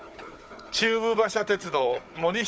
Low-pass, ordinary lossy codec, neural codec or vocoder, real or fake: none; none; codec, 16 kHz, 16 kbps, FunCodec, trained on Chinese and English, 50 frames a second; fake